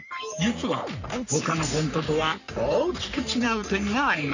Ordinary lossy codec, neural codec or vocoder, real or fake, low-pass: none; codec, 44.1 kHz, 3.4 kbps, Pupu-Codec; fake; 7.2 kHz